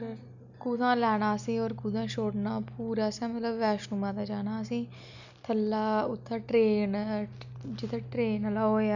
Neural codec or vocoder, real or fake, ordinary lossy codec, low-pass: none; real; none; 7.2 kHz